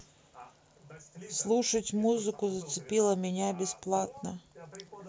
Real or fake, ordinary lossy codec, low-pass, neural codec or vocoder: real; none; none; none